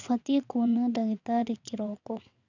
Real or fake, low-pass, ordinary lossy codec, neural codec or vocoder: fake; 7.2 kHz; none; vocoder, 22.05 kHz, 80 mel bands, WaveNeXt